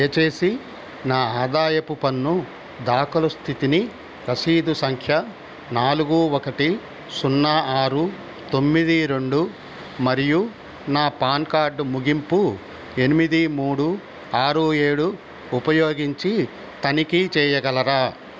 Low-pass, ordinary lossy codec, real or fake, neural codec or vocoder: none; none; real; none